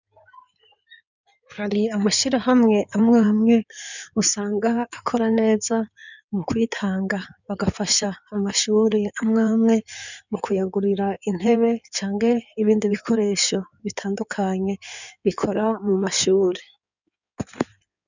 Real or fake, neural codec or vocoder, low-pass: fake; codec, 16 kHz in and 24 kHz out, 2.2 kbps, FireRedTTS-2 codec; 7.2 kHz